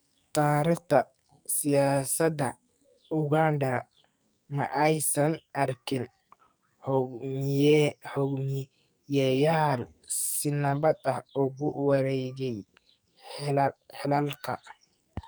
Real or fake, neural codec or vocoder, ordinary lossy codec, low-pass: fake; codec, 44.1 kHz, 2.6 kbps, SNAC; none; none